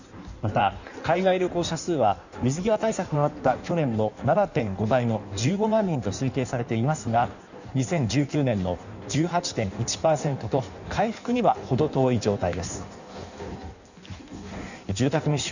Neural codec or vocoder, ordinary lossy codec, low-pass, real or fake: codec, 16 kHz in and 24 kHz out, 1.1 kbps, FireRedTTS-2 codec; none; 7.2 kHz; fake